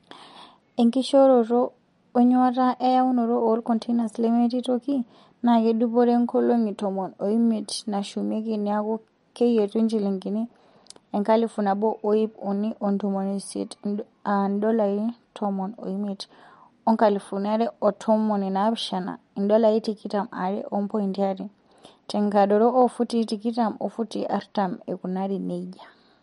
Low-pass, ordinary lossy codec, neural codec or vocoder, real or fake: 19.8 kHz; MP3, 48 kbps; none; real